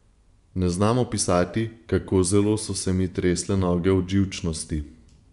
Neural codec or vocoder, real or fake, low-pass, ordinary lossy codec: vocoder, 24 kHz, 100 mel bands, Vocos; fake; 10.8 kHz; none